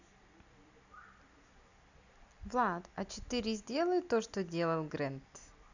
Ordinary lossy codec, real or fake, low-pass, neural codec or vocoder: none; real; 7.2 kHz; none